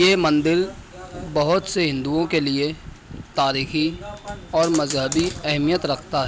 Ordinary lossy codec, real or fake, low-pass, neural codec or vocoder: none; real; none; none